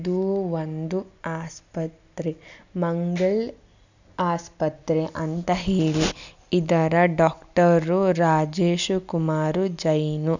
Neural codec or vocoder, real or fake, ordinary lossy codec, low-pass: none; real; none; 7.2 kHz